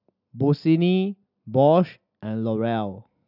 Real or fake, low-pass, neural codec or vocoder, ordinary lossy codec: real; 5.4 kHz; none; none